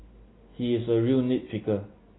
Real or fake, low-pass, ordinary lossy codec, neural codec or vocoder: real; 7.2 kHz; AAC, 16 kbps; none